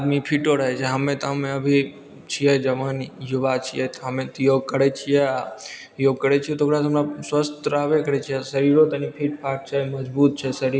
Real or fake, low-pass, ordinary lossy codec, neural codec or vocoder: real; none; none; none